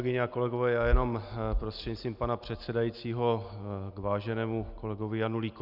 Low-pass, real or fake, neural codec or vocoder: 5.4 kHz; real; none